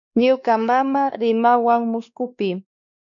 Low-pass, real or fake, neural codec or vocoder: 7.2 kHz; fake; codec, 16 kHz, 2 kbps, X-Codec, WavLM features, trained on Multilingual LibriSpeech